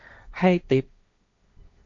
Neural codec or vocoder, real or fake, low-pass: codec, 16 kHz, 1.1 kbps, Voila-Tokenizer; fake; 7.2 kHz